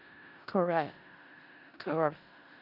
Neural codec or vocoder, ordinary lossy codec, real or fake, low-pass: codec, 16 kHz in and 24 kHz out, 0.4 kbps, LongCat-Audio-Codec, four codebook decoder; none; fake; 5.4 kHz